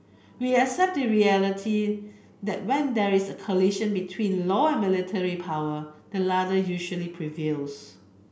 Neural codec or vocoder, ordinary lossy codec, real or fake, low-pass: none; none; real; none